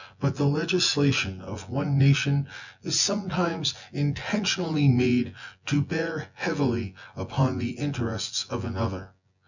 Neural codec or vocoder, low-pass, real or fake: vocoder, 24 kHz, 100 mel bands, Vocos; 7.2 kHz; fake